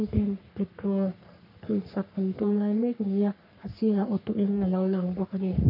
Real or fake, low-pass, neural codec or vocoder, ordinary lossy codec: fake; 5.4 kHz; codec, 44.1 kHz, 3.4 kbps, Pupu-Codec; none